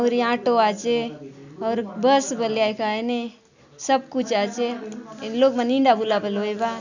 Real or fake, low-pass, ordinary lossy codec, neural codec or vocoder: real; 7.2 kHz; none; none